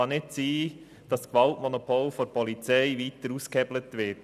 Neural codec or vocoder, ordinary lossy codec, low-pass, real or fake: none; none; 14.4 kHz; real